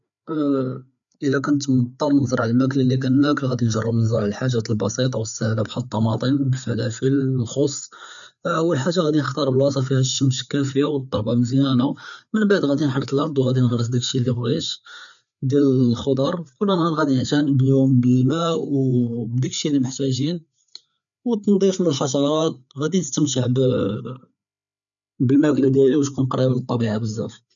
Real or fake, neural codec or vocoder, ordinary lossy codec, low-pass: fake; codec, 16 kHz, 4 kbps, FreqCodec, larger model; none; 7.2 kHz